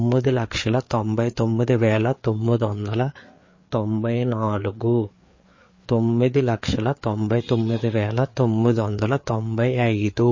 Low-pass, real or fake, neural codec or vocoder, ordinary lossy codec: 7.2 kHz; fake; codec, 16 kHz, 4 kbps, FreqCodec, larger model; MP3, 32 kbps